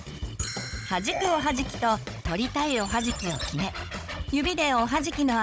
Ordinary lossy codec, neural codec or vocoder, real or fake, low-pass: none; codec, 16 kHz, 16 kbps, FunCodec, trained on Chinese and English, 50 frames a second; fake; none